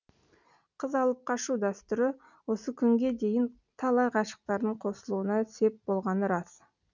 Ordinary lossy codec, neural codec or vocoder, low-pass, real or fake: none; none; 7.2 kHz; real